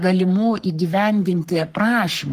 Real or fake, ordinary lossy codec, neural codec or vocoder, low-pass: fake; Opus, 24 kbps; codec, 44.1 kHz, 3.4 kbps, Pupu-Codec; 14.4 kHz